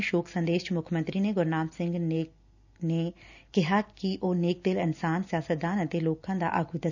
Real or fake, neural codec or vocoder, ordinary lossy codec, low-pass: real; none; none; 7.2 kHz